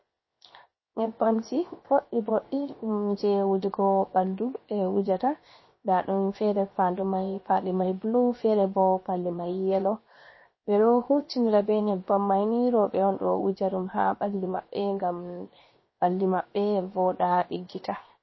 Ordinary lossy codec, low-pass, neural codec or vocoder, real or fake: MP3, 24 kbps; 7.2 kHz; codec, 16 kHz, 0.7 kbps, FocalCodec; fake